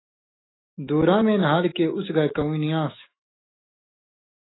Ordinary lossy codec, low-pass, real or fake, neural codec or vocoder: AAC, 16 kbps; 7.2 kHz; fake; autoencoder, 48 kHz, 128 numbers a frame, DAC-VAE, trained on Japanese speech